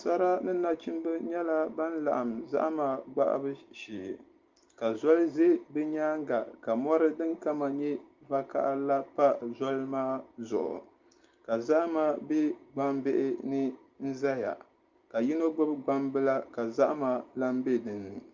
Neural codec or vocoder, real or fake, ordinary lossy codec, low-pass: none; real; Opus, 24 kbps; 7.2 kHz